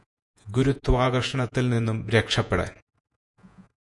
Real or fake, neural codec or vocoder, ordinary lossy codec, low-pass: fake; vocoder, 48 kHz, 128 mel bands, Vocos; MP3, 96 kbps; 10.8 kHz